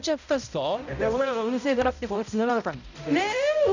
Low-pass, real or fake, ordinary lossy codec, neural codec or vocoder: 7.2 kHz; fake; none; codec, 16 kHz, 0.5 kbps, X-Codec, HuBERT features, trained on balanced general audio